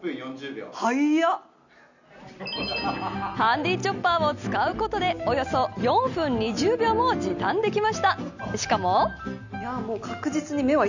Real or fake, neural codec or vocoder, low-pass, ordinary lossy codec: real; none; 7.2 kHz; none